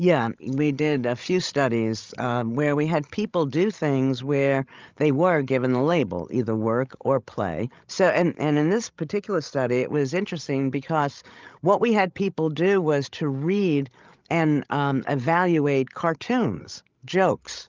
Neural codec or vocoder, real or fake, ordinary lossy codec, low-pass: codec, 16 kHz, 16 kbps, FreqCodec, larger model; fake; Opus, 32 kbps; 7.2 kHz